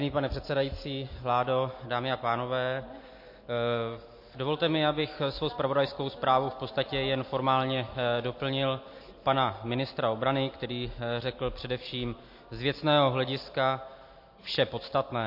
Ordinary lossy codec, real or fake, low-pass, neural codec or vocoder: MP3, 32 kbps; real; 5.4 kHz; none